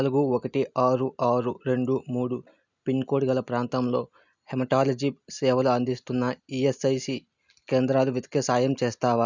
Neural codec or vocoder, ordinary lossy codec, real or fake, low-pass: none; none; real; 7.2 kHz